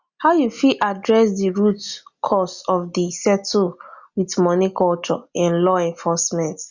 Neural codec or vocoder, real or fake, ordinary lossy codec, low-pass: none; real; Opus, 64 kbps; 7.2 kHz